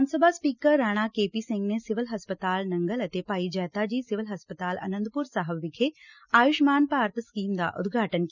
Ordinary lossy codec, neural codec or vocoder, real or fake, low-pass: none; none; real; 7.2 kHz